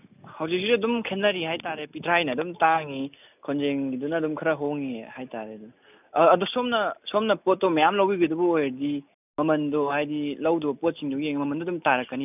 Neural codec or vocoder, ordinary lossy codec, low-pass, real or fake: none; none; 3.6 kHz; real